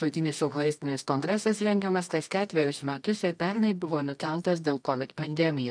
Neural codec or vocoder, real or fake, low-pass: codec, 24 kHz, 0.9 kbps, WavTokenizer, medium music audio release; fake; 9.9 kHz